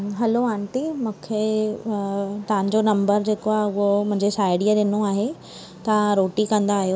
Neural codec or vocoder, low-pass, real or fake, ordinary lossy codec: none; none; real; none